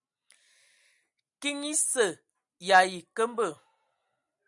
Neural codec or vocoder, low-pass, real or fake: none; 10.8 kHz; real